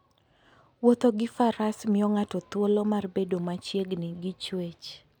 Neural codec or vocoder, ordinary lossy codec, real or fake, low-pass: none; none; real; 19.8 kHz